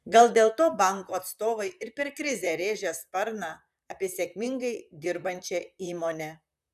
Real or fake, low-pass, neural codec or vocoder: fake; 14.4 kHz; vocoder, 44.1 kHz, 128 mel bands, Pupu-Vocoder